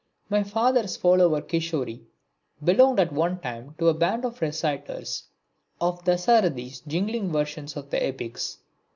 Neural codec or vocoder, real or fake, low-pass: none; real; 7.2 kHz